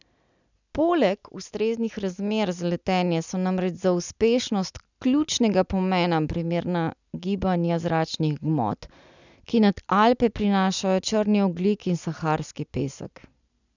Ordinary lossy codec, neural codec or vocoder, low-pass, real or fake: none; none; 7.2 kHz; real